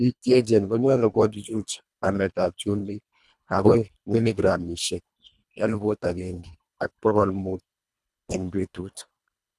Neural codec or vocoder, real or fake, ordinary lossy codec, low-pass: codec, 24 kHz, 1.5 kbps, HILCodec; fake; none; none